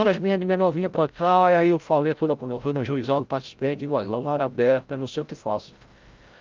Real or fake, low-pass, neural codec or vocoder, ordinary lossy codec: fake; 7.2 kHz; codec, 16 kHz, 0.5 kbps, FreqCodec, larger model; Opus, 24 kbps